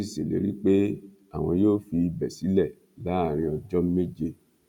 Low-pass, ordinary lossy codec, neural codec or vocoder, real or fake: 19.8 kHz; none; none; real